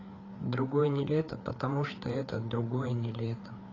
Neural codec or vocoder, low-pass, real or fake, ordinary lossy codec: codec, 16 kHz, 4 kbps, FreqCodec, larger model; 7.2 kHz; fake; AAC, 32 kbps